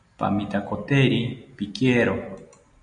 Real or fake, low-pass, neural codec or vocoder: real; 9.9 kHz; none